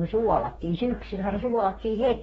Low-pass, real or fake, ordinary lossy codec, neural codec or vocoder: 19.8 kHz; fake; AAC, 24 kbps; codec, 44.1 kHz, 2.6 kbps, DAC